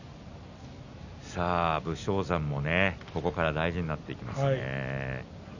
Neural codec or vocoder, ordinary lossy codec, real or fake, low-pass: none; none; real; 7.2 kHz